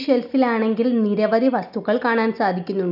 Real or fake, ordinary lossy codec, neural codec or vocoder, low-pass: real; none; none; 5.4 kHz